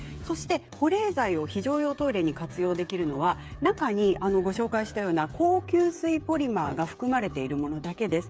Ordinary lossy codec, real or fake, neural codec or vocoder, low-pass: none; fake; codec, 16 kHz, 8 kbps, FreqCodec, smaller model; none